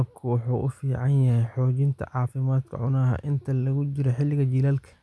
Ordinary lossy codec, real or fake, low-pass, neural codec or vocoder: none; real; none; none